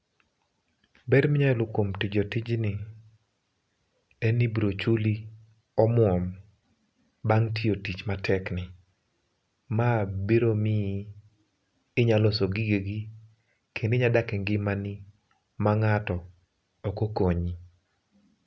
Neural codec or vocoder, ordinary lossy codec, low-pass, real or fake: none; none; none; real